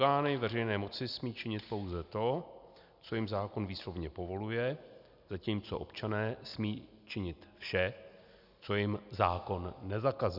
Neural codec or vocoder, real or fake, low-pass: none; real; 5.4 kHz